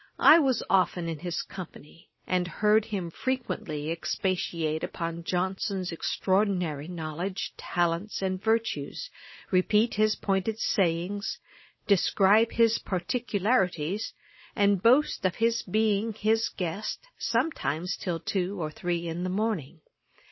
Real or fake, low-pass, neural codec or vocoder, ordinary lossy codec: real; 7.2 kHz; none; MP3, 24 kbps